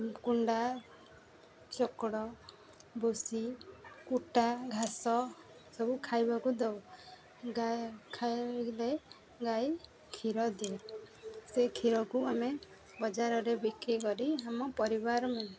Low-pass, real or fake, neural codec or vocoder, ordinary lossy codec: none; real; none; none